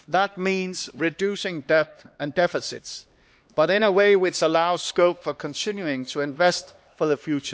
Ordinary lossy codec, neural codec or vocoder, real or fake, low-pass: none; codec, 16 kHz, 2 kbps, X-Codec, HuBERT features, trained on LibriSpeech; fake; none